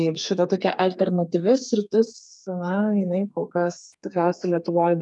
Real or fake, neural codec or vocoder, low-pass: fake; codec, 32 kHz, 1.9 kbps, SNAC; 10.8 kHz